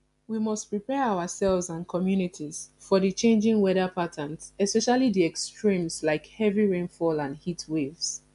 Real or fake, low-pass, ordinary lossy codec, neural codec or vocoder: real; 10.8 kHz; MP3, 96 kbps; none